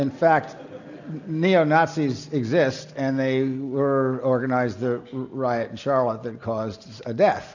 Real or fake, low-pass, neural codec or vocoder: real; 7.2 kHz; none